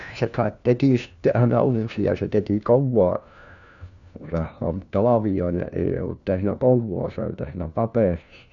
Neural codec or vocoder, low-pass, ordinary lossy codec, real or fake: codec, 16 kHz, 1 kbps, FunCodec, trained on LibriTTS, 50 frames a second; 7.2 kHz; none; fake